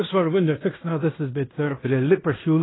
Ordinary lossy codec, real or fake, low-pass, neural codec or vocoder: AAC, 16 kbps; fake; 7.2 kHz; codec, 16 kHz in and 24 kHz out, 0.9 kbps, LongCat-Audio-Codec, fine tuned four codebook decoder